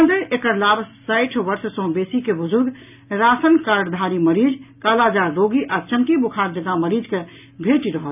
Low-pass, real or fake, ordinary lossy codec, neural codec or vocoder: 3.6 kHz; real; none; none